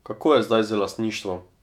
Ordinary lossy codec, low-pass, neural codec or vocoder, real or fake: none; 19.8 kHz; autoencoder, 48 kHz, 128 numbers a frame, DAC-VAE, trained on Japanese speech; fake